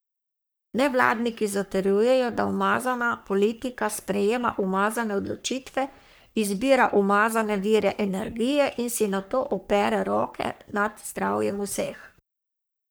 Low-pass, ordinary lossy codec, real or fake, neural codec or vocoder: none; none; fake; codec, 44.1 kHz, 3.4 kbps, Pupu-Codec